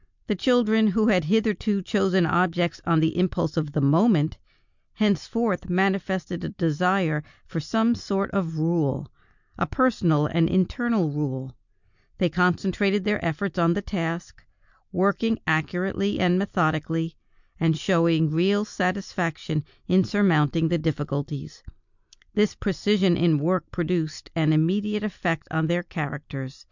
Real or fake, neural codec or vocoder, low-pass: real; none; 7.2 kHz